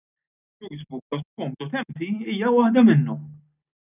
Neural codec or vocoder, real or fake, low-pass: none; real; 3.6 kHz